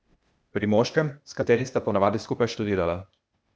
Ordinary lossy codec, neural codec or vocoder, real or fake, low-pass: none; codec, 16 kHz, 0.8 kbps, ZipCodec; fake; none